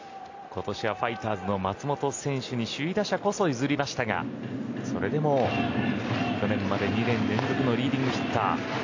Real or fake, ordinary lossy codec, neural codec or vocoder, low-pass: real; AAC, 48 kbps; none; 7.2 kHz